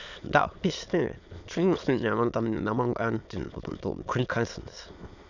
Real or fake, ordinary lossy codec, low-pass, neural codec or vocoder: fake; none; 7.2 kHz; autoencoder, 22.05 kHz, a latent of 192 numbers a frame, VITS, trained on many speakers